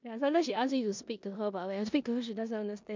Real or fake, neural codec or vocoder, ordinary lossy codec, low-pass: fake; codec, 16 kHz in and 24 kHz out, 0.9 kbps, LongCat-Audio-Codec, four codebook decoder; MP3, 64 kbps; 7.2 kHz